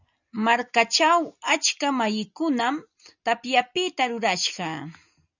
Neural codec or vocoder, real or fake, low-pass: none; real; 7.2 kHz